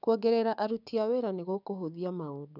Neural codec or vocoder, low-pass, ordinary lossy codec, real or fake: codec, 16 kHz, 6 kbps, DAC; 5.4 kHz; none; fake